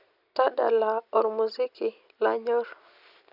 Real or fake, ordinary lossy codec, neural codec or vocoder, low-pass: real; none; none; 5.4 kHz